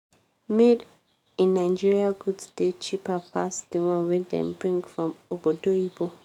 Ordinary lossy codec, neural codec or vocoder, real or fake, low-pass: none; codec, 44.1 kHz, 7.8 kbps, DAC; fake; 19.8 kHz